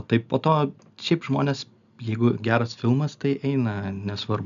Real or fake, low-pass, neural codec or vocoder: real; 7.2 kHz; none